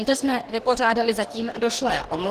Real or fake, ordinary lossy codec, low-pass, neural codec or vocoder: fake; Opus, 16 kbps; 14.4 kHz; codec, 44.1 kHz, 2.6 kbps, DAC